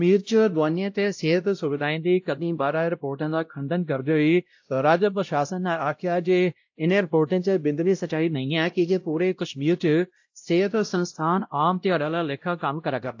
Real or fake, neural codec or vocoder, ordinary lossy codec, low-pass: fake; codec, 16 kHz, 0.5 kbps, X-Codec, WavLM features, trained on Multilingual LibriSpeech; AAC, 48 kbps; 7.2 kHz